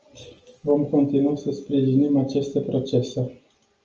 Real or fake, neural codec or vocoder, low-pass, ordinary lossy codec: real; none; 7.2 kHz; Opus, 24 kbps